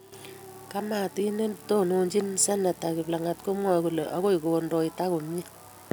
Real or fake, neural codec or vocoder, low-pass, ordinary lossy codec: real; none; none; none